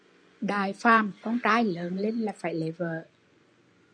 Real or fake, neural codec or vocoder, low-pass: fake; vocoder, 24 kHz, 100 mel bands, Vocos; 9.9 kHz